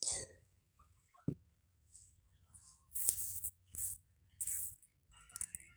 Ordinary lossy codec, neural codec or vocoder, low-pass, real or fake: none; codec, 44.1 kHz, 2.6 kbps, SNAC; none; fake